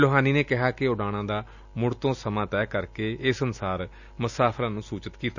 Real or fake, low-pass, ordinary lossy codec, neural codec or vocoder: real; 7.2 kHz; none; none